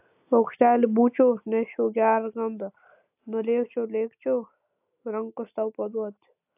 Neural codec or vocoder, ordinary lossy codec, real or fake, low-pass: none; AAC, 32 kbps; real; 3.6 kHz